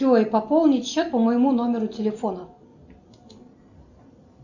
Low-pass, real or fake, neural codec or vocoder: 7.2 kHz; real; none